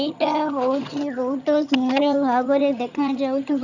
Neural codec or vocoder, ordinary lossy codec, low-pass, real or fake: vocoder, 22.05 kHz, 80 mel bands, HiFi-GAN; none; 7.2 kHz; fake